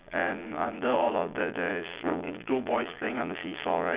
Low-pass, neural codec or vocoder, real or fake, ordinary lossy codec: 3.6 kHz; vocoder, 22.05 kHz, 80 mel bands, Vocos; fake; Opus, 64 kbps